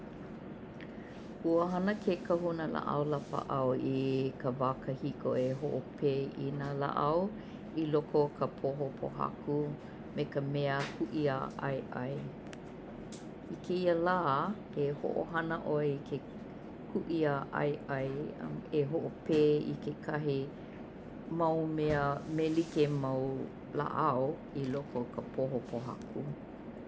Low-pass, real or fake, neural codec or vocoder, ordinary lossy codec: none; real; none; none